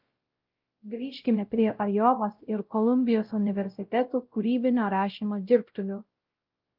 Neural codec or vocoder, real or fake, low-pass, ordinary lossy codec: codec, 16 kHz, 0.5 kbps, X-Codec, WavLM features, trained on Multilingual LibriSpeech; fake; 5.4 kHz; Opus, 32 kbps